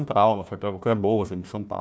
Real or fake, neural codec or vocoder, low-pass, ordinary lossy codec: fake; codec, 16 kHz, 1 kbps, FunCodec, trained on Chinese and English, 50 frames a second; none; none